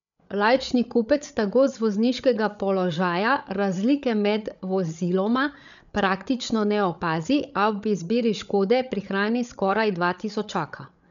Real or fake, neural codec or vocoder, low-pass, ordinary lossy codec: fake; codec, 16 kHz, 8 kbps, FreqCodec, larger model; 7.2 kHz; MP3, 96 kbps